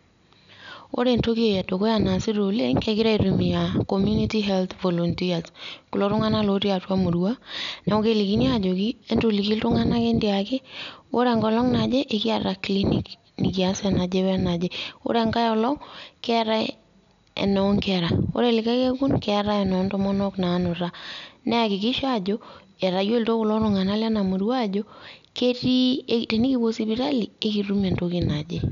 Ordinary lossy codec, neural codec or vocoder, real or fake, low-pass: none; none; real; 7.2 kHz